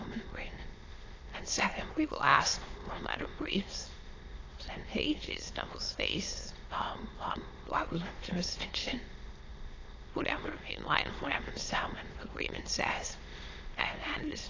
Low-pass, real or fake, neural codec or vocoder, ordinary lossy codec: 7.2 kHz; fake; autoencoder, 22.05 kHz, a latent of 192 numbers a frame, VITS, trained on many speakers; AAC, 32 kbps